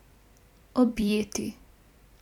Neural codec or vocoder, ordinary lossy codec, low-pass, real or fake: vocoder, 44.1 kHz, 128 mel bands every 256 samples, BigVGAN v2; none; 19.8 kHz; fake